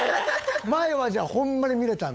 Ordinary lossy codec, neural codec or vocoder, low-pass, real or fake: none; codec, 16 kHz, 8 kbps, FunCodec, trained on LibriTTS, 25 frames a second; none; fake